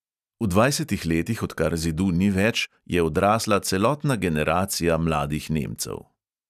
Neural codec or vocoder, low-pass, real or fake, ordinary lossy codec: none; 14.4 kHz; real; none